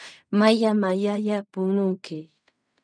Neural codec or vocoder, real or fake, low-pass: codec, 16 kHz in and 24 kHz out, 0.4 kbps, LongCat-Audio-Codec, fine tuned four codebook decoder; fake; 9.9 kHz